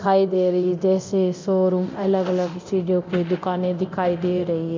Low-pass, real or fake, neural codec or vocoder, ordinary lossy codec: 7.2 kHz; fake; codec, 24 kHz, 0.9 kbps, DualCodec; MP3, 64 kbps